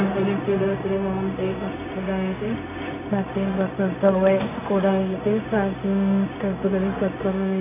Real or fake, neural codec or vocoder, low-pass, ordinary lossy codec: fake; codec, 16 kHz, 0.4 kbps, LongCat-Audio-Codec; 3.6 kHz; AAC, 24 kbps